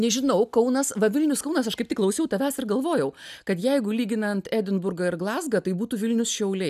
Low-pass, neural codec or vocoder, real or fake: 14.4 kHz; none; real